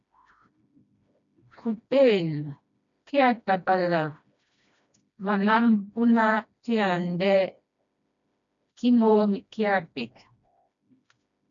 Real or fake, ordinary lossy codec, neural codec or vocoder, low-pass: fake; MP3, 64 kbps; codec, 16 kHz, 1 kbps, FreqCodec, smaller model; 7.2 kHz